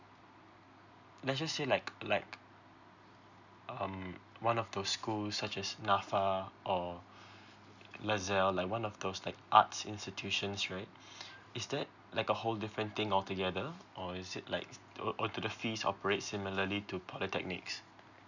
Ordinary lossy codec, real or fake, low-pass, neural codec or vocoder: none; real; 7.2 kHz; none